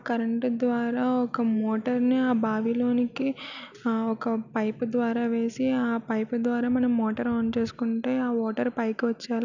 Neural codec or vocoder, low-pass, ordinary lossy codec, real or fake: none; 7.2 kHz; none; real